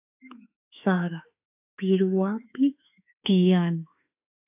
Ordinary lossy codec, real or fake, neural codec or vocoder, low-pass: AAC, 32 kbps; fake; autoencoder, 48 kHz, 32 numbers a frame, DAC-VAE, trained on Japanese speech; 3.6 kHz